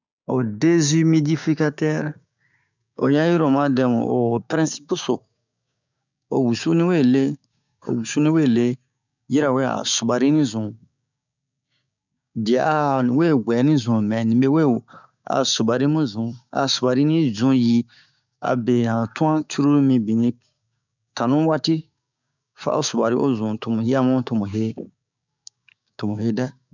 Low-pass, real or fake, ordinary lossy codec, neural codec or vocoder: 7.2 kHz; fake; none; codec, 16 kHz, 6 kbps, DAC